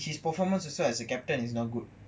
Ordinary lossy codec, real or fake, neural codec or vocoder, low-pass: none; real; none; none